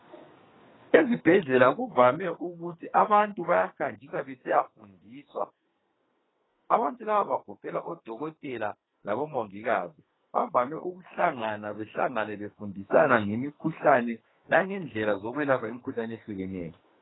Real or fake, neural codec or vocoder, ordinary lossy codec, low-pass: fake; codec, 44.1 kHz, 2.6 kbps, SNAC; AAC, 16 kbps; 7.2 kHz